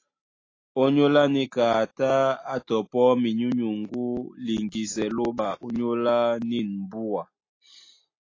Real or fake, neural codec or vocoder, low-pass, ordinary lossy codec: real; none; 7.2 kHz; AAC, 32 kbps